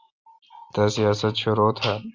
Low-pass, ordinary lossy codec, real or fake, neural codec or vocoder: 7.2 kHz; Opus, 32 kbps; real; none